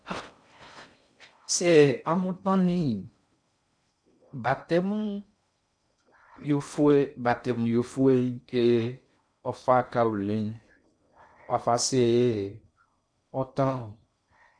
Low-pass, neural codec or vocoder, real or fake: 9.9 kHz; codec, 16 kHz in and 24 kHz out, 0.6 kbps, FocalCodec, streaming, 4096 codes; fake